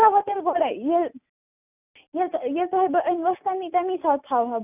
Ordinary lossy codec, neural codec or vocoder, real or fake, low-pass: none; none; real; 3.6 kHz